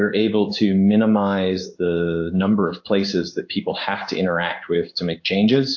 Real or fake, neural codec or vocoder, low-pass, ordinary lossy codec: fake; codec, 16 kHz in and 24 kHz out, 1 kbps, XY-Tokenizer; 7.2 kHz; AAC, 48 kbps